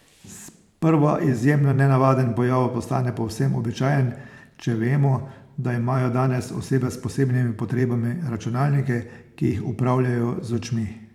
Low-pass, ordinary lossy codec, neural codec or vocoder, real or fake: 19.8 kHz; none; vocoder, 44.1 kHz, 128 mel bands every 512 samples, BigVGAN v2; fake